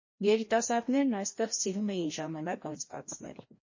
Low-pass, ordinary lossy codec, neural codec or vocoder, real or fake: 7.2 kHz; MP3, 32 kbps; codec, 16 kHz, 1 kbps, FreqCodec, larger model; fake